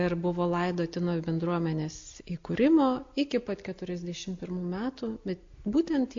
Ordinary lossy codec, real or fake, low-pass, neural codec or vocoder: AAC, 48 kbps; real; 7.2 kHz; none